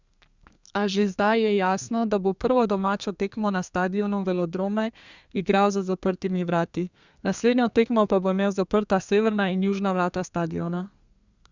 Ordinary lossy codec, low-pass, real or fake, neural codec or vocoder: Opus, 64 kbps; 7.2 kHz; fake; codec, 32 kHz, 1.9 kbps, SNAC